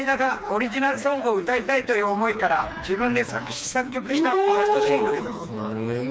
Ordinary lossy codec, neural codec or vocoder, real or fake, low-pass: none; codec, 16 kHz, 2 kbps, FreqCodec, smaller model; fake; none